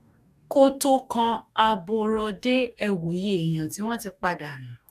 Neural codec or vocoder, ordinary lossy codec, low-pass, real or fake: codec, 44.1 kHz, 2.6 kbps, DAC; none; 14.4 kHz; fake